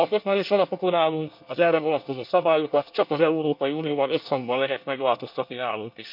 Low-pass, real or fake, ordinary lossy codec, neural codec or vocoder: 5.4 kHz; fake; none; codec, 24 kHz, 1 kbps, SNAC